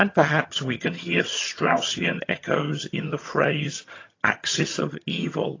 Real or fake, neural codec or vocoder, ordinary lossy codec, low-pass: fake; vocoder, 22.05 kHz, 80 mel bands, HiFi-GAN; AAC, 32 kbps; 7.2 kHz